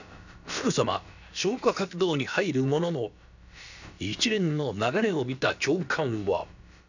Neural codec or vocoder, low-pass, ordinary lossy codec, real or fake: codec, 16 kHz, about 1 kbps, DyCAST, with the encoder's durations; 7.2 kHz; none; fake